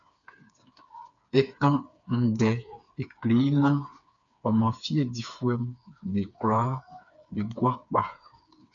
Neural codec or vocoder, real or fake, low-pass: codec, 16 kHz, 4 kbps, FreqCodec, smaller model; fake; 7.2 kHz